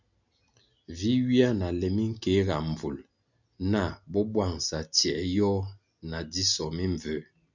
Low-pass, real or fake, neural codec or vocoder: 7.2 kHz; real; none